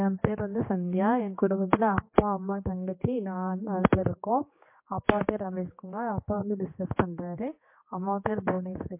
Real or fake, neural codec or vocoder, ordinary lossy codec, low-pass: fake; codec, 16 kHz, 2 kbps, X-Codec, HuBERT features, trained on general audio; MP3, 24 kbps; 3.6 kHz